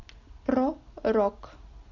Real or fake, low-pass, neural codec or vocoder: real; 7.2 kHz; none